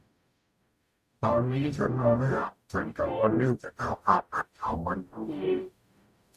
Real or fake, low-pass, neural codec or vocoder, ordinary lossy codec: fake; 14.4 kHz; codec, 44.1 kHz, 0.9 kbps, DAC; Opus, 64 kbps